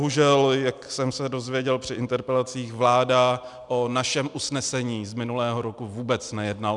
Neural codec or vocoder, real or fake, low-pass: none; real; 10.8 kHz